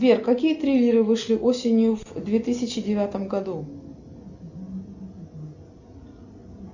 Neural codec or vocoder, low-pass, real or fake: none; 7.2 kHz; real